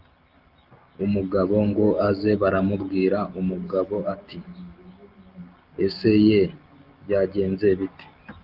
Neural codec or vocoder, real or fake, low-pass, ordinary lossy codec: none; real; 5.4 kHz; Opus, 32 kbps